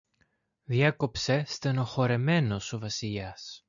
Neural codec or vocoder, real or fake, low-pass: none; real; 7.2 kHz